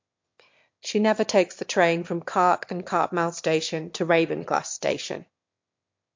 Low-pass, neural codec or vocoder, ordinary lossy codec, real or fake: 7.2 kHz; autoencoder, 22.05 kHz, a latent of 192 numbers a frame, VITS, trained on one speaker; MP3, 48 kbps; fake